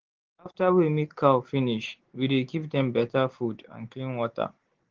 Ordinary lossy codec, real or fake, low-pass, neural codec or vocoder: Opus, 16 kbps; real; 7.2 kHz; none